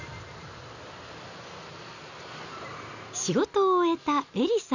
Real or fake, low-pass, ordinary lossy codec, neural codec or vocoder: real; 7.2 kHz; none; none